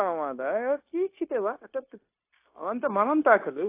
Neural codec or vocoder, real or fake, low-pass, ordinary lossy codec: codec, 16 kHz, 0.9 kbps, LongCat-Audio-Codec; fake; 3.6 kHz; AAC, 24 kbps